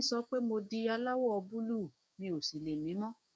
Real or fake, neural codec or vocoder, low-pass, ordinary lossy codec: fake; codec, 16 kHz, 6 kbps, DAC; none; none